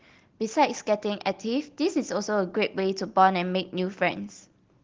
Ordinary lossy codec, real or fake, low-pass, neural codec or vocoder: Opus, 16 kbps; real; 7.2 kHz; none